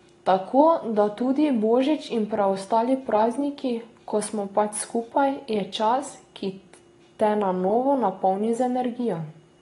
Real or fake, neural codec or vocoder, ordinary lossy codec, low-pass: real; none; AAC, 32 kbps; 10.8 kHz